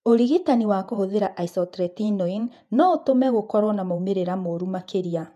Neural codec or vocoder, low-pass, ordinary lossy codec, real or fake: vocoder, 48 kHz, 128 mel bands, Vocos; 14.4 kHz; none; fake